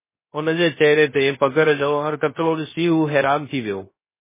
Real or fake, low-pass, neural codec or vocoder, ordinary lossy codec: fake; 3.6 kHz; codec, 16 kHz, 0.3 kbps, FocalCodec; MP3, 16 kbps